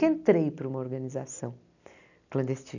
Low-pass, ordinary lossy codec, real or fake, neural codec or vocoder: 7.2 kHz; none; real; none